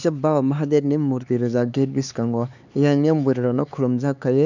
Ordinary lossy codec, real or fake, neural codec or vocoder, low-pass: none; fake; codec, 16 kHz, 4 kbps, X-Codec, HuBERT features, trained on LibriSpeech; 7.2 kHz